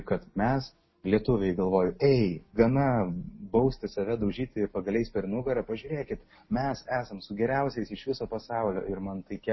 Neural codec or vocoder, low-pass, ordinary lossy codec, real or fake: none; 7.2 kHz; MP3, 24 kbps; real